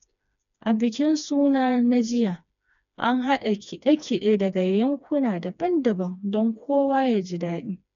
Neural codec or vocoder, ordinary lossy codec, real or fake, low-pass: codec, 16 kHz, 2 kbps, FreqCodec, smaller model; none; fake; 7.2 kHz